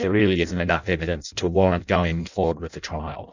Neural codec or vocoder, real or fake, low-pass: codec, 16 kHz in and 24 kHz out, 0.6 kbps, FireRedTTS-2 codec; fake; 7.2 kHz